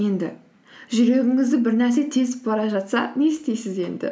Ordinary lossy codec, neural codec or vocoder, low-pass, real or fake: none; none; none; real